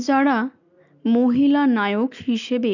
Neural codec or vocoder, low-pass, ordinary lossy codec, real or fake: none; 7.2 kHz; none; real